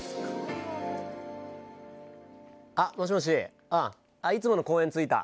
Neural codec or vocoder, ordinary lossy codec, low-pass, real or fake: none; none; none; real